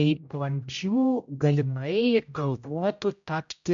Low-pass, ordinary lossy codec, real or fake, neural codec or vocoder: 7.2 kHz; MP3, 64 kbps; fake; codec, 16 kHz, 0.5 kbps, X-Codec, HuBERT features, trained on general audio